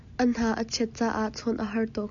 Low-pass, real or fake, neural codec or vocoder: 7.2 kHz; real; none